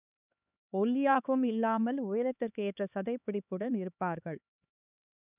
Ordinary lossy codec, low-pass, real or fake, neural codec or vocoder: none; 3.6 kHz; fake; codec, 16 kHz, 4 kbps, X-Codec, HuBERT features, trained on LibriSpeech